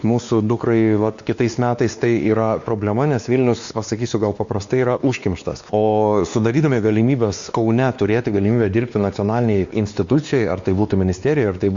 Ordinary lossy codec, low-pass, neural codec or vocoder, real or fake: Opus, 64 kbps; 7.2 kHz; codec, 16 kHz, 2 kbps, X-Codec, WavLM features, trained on Multilingual LibriSpeech; fake